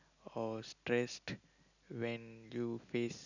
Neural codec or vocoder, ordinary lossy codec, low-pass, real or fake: none; none; 7.2 kHz; real